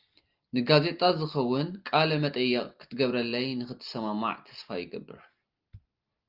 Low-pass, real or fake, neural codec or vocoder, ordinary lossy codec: 5.4 kHz; real; none; Opus, 32 kbps